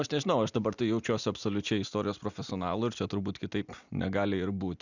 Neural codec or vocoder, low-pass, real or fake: none; 7.2 kHz; real